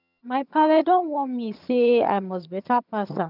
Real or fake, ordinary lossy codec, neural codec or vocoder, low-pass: fake; none; vocoder, 22.05 kHz, 80 mel bands, HiFi-GAN; 5.4 kHz